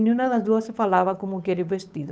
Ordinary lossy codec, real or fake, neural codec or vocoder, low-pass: none; fake; codec, 16 kHz, 8 kbps, FunCodec, trained on Chinese and English, 25 frames a second; none